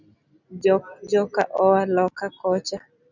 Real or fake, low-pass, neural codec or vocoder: real; 7.2 kHz; none